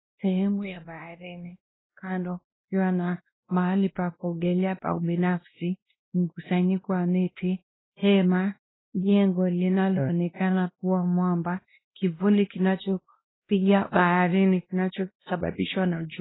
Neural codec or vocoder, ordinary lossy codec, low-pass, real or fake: codec, 16 kHz, 1 kbps, X-Codec, WavLM features, trained on Multilingual LibriSpeech; AAC, 16 kbps; 7.2 kHz; fake